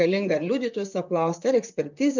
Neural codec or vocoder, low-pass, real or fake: vocoder, 44.1 kHz, 128 mel bands, Pupu-Vocoder; 7.2 kHz; fake